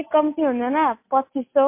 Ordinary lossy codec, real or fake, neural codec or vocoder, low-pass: MP3, 24 kbps; real; none; 3.6 kHz